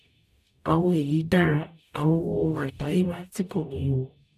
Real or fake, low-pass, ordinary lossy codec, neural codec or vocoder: fake; 14.4 kHz; none; codec, 44.1 kHz, 0.9 kbps, DAC